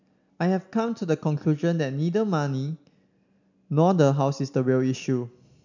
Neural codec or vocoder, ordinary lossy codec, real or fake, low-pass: none; none; real; 7.2 kHz